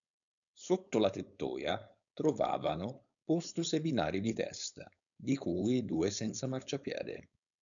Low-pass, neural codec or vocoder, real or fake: 7.2 kHz; codec, 16 kHz, 4.8 kbps, FACodec; fake